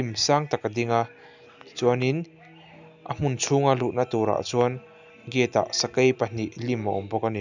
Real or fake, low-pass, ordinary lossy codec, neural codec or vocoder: real; 7.2 kHz; none; none